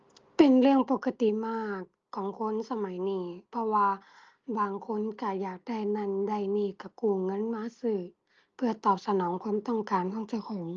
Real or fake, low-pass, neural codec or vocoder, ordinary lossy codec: real; 7.2 kHz; none; Opus, 24 kbps